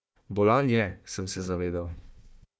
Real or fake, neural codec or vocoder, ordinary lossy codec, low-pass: fake; codec, 16 kHz, 1 kbps, FunCodec, trained on Chinese and English, 50 frames a second; none; none